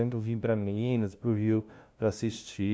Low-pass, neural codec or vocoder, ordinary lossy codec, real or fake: none; codec, 16 kHz, 0.5 kbps, FunCodec, trained on LibriTTS, 25 frames a second; none; fake